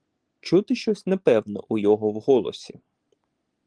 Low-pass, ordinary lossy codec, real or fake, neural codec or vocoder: 9.9 kHz; Opus, 24 kbps; real; none